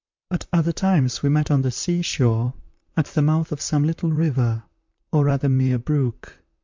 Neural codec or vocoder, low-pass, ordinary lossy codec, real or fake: vocoder, 44.1 kHz, 128 mel bands, Pupu-Vocoder; 7.2 kHz; MP3, 64 kbps; fake